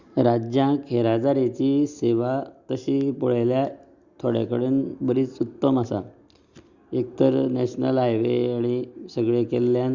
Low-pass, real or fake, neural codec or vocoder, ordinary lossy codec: 7.2 kHz; real; none; Opus, 64 kbps